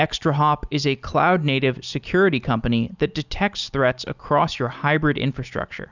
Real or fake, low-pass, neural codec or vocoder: real; 7.2 kHz; none